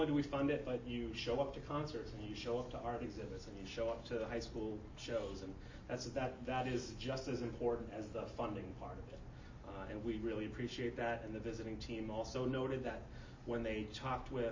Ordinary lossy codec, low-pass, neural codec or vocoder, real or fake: MP3, 32 kbps; 7.2 kHz; none; real